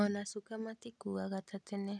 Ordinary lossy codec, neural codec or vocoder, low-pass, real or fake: none; none; none; real